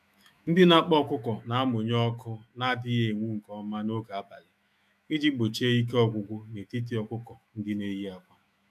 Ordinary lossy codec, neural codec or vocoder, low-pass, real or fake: none; autoencoder, 48 kHz, 128 numbers a frame, DAC-VAE, trained on Japanese speech; 14.4 kHz; fake